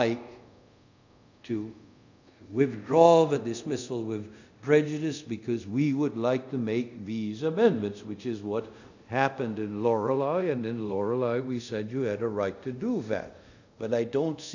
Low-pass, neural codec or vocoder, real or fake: 7.2 kHz; codec, 24 kHz, 0.5 kbps, DualCodec; fake